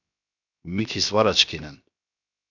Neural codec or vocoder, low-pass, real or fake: codec, 16 kHz, 0.7 kbps, FocalCodec; 7.2 kHz; fake